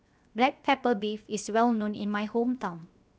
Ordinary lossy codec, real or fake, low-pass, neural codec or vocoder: none; fake; none; codec, 16 kHz, 0.7 kbps, FocalCodec